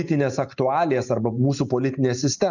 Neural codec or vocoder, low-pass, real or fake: none; 7.2 kHz; real